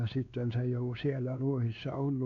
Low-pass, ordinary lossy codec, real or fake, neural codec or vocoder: 7.2 kHz; none; fake; codec, 16 kHz, 2 kbps, X-Codec, WavLM features, trained on Multilingual LibriSpeech